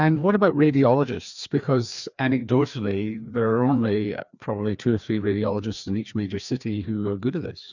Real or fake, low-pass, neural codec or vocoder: fake; 7.2 kHz; codec, 16 kHz, 2 kbps, FreqCodec, larger model